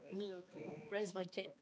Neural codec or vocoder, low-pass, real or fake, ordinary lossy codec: codec, 16 kHz, 2 kbps, X-Codec, HuBERT features, trained on balanced general audio; none; fake; none